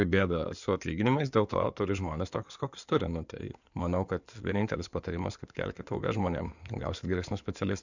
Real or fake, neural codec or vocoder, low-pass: fake; codec, 16 kHz in and 24 kHz out, 2.2 kbps, FireRedTTS-2 codec; 7.2 kHz